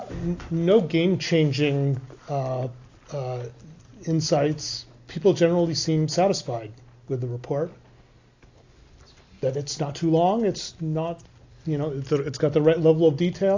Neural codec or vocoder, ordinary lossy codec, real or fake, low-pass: none; AAC, 48 kbps; real; 7.2 kHz